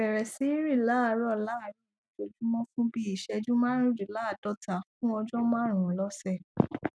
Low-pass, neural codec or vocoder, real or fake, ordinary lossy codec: none; none; real; none